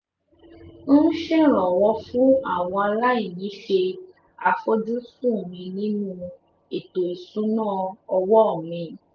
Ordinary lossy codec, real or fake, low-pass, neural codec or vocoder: none; real; none; none